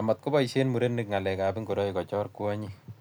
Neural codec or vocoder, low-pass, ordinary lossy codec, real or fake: none; none; none; real